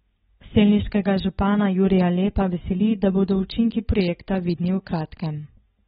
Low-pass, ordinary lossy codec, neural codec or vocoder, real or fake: 19.8 kHz; AAC, 16 kbps; none; real